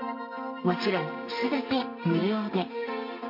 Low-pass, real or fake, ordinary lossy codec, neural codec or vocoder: 5.4 kHz; fake; MP3, 32 kbps; codec, 32 kHz, 1.9 kbps, SNAC